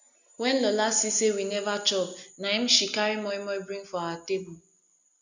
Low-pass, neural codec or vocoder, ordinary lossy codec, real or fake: 7.2 kHz; none; none; real